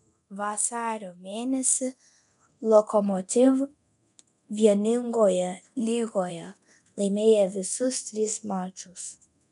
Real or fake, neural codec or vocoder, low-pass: fake; codec, 24 kHz, 0.9 kbps, DualCodec; 10.8 kHz